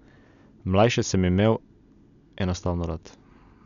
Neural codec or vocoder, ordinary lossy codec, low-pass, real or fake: none; none; 7.2 kHz; real